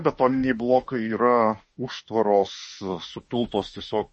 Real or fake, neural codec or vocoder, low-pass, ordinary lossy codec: fake; autoencoder, 48 kHz, 32 numbers a frame, DAC-VAE, trained on Japanese speech; 10.8 kHz; MP3, 32 kbps